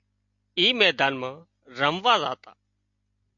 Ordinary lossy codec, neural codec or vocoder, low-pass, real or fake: AAC, 64 kbps; none; 7.2 kHz; real